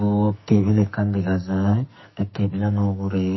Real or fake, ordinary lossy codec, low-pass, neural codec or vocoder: fake; MP3, 24 kbps; 7.2 kHz; codec, 44.1 kHz, 2.6 kbps, SNAC